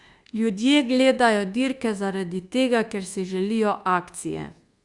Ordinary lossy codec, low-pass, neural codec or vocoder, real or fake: Opus, 64 kbps; 10.8 kHz; codec, 24 kHz, 1.2 kbps, DualCodec; fake